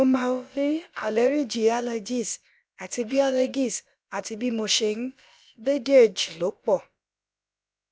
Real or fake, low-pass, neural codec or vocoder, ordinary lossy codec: fake; none; codec, 16 kHz, about 1 kbps, DyCAST, with the encoder's durations; none